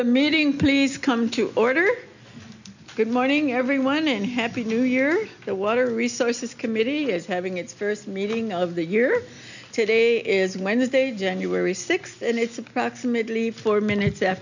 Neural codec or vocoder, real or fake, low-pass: none; real; 7.2 kHz